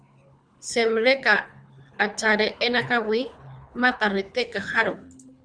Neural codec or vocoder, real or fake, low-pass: codec, 24 kHz, 3 kbps, HILCodec; fake; 9.9 kHz